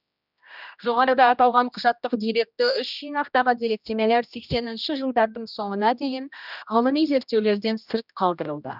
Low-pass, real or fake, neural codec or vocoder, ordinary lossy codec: 5.4 kHz; fake; codec, 16 kHz, 1 kbps, X-Codec, HuBERT features, trained on general audio; none